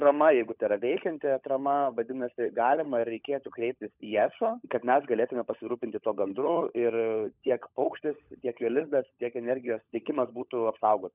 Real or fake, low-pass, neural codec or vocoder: fake; 3.6 kHz; codec, 16 kHz, 16 kbps, FunCodec, trained on LibriTTS, 50 frames a second